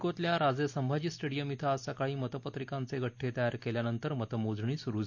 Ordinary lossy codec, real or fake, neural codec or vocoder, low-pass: none; real; none; 7.2 kHz